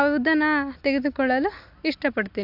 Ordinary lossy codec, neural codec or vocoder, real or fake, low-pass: AAC, 48 kbps; none; real; 5.4 kHz